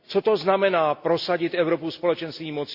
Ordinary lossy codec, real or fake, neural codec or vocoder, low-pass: Opus, 64 kbps; real; none; 5.4 kHz